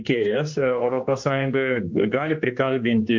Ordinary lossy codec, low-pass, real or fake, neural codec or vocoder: MP3, 48 kbps; 7.2 kHz; fake; codec, 16 kHz, 2 kbps, X-Codec, HuBERT features, trained on general audio